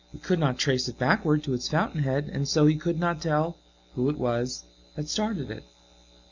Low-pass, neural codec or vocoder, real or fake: 7.2 kHz; none; real